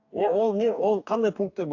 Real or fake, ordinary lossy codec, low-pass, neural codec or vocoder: fake; AAC, 48 kbps; 7.2 kHz; codec, 44.1 kHz, 2.6 kbps, DAC